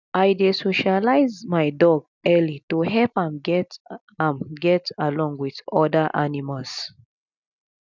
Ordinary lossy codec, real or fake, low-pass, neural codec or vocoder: none; real; 7.2 kHz; none